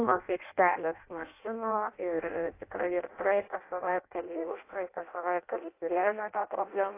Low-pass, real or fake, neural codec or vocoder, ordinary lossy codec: 3.6 kHz; fake; codec, 16 kHz in and 24 kHz out, 0.6 kbps, FireRedTTS-2 codec; AAC, 24 kbps